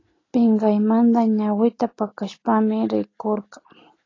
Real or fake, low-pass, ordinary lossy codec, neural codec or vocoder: real; 7.2 kHz; AAC, 32 kbps; none